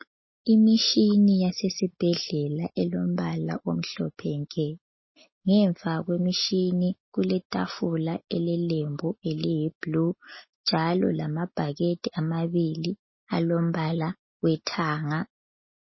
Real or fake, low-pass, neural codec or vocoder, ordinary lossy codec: real; 7.2 kHz; none; MP3, 24 kbps